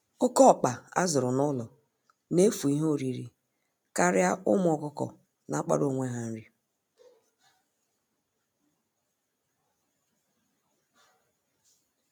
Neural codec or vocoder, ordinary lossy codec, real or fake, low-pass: none; none; real; none